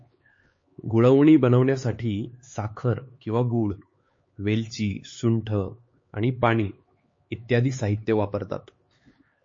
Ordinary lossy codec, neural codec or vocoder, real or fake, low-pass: MP3, 32 kbps; codec, 16 kHz, 4 kbps, X-Codec, HuBERT features, trained on LibriSpeech; fake; 7.2 kHz